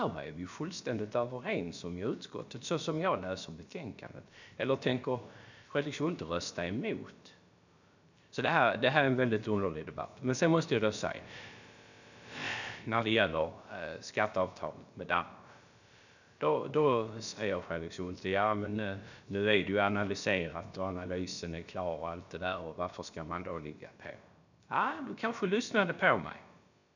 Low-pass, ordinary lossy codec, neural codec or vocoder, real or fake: 7.2 kHz; none; codec, 16 kHz, about 1 kbps, DyCAST, with the encoder's durations; fake